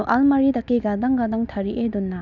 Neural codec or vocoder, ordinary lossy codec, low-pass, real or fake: none; none; 7.2 kHz; real